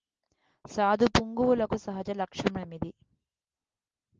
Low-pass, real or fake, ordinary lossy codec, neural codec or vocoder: 7.2 kHz; real; Opus, 16 kbps; none